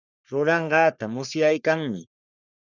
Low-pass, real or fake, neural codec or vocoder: 7.2 kHz; fake; codec, 44.1 kHz, 3.4 kbps, Pupu-Codec